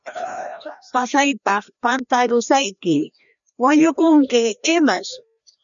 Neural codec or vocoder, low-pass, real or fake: codec, 16 kHz, 1 kbps, FreqCodec, larger model; 7.2 kHz; fake